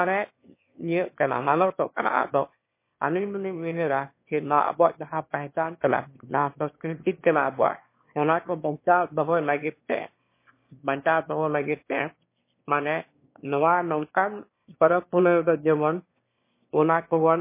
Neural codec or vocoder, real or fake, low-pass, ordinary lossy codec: autoencoder, 22.05 kHz, a latent of 192 numbers a frame, VITS, trained on one speaker; fake; 3.6 kHz; MP3, 24 kbps